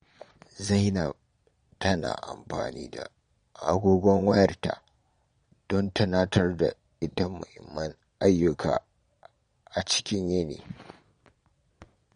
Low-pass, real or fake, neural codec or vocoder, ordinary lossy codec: 9.9 kHz; fake; vocoder, 22.05 kHz, 80 mel bands, Vocos; MP3, 48 kbps